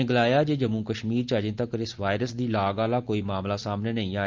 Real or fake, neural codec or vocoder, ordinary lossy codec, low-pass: real; none; Opus, 24 kbps; 7.2 kHz